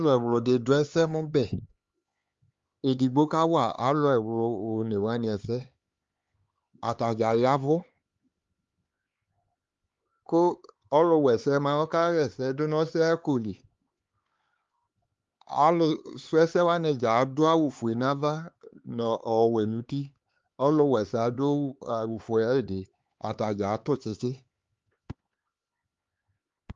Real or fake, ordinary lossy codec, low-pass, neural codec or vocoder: fake; Opus, 32 kbps; 7.2 kHz; codec, 16 kHz, 4 kbps, X-Codec, HuBERT features, trained on LibriSpeech